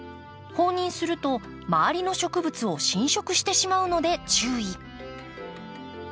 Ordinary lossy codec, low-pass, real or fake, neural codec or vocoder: none; none; real; none